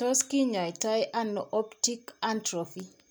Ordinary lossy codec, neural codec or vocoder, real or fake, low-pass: none; none; real; none